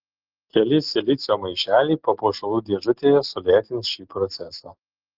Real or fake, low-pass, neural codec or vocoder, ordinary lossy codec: real; 5.4 kHz; none; Opus, 16 kbps